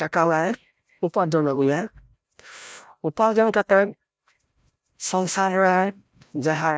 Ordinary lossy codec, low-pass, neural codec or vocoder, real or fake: none; none; codec, 16 kHz, 0.5 kbps, FreqCodec, larger model; fake